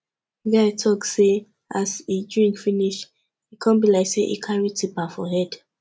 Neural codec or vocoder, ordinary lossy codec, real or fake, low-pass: none; none; real; none